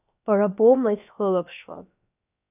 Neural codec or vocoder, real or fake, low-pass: codec, 16 kHz, about 1 kbps, DyCAST, with the encoder's durations; fake; 3.6 kHz